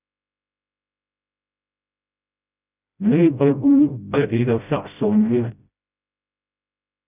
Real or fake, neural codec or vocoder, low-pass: fake; codec, 16 kHz, 0.5 kbps, FreqCodec, smaller model; 3.6 kHz